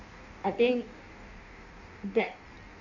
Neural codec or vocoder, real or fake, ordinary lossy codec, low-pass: codec, 16 kHz in and 24 kHz out, 1.1 kbps, FireRedTTS-2 codec; fake; none; 7.2 kHz